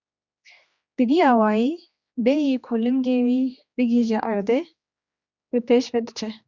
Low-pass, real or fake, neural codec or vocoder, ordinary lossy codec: 7.2 kHz; fake; codec, 16 kHz, 2 kbps, X-Codec, HuBERT features, trained on general audio; Opus, 64 kbps